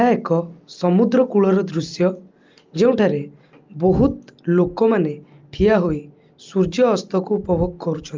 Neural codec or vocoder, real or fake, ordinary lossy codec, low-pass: none; real; Opus, 24 kbps; 7.2 kHz